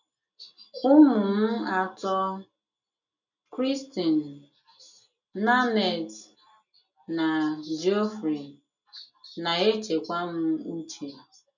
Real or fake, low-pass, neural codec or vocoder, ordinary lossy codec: real; 7.2 kHz; none; none